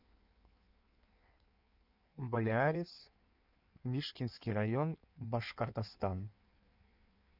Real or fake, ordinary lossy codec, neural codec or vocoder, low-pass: fake; none; codec, 16 kHz in and 24 kHz out, 1.1 kbps, FireRedTTS-2 codec; 5.4 kHz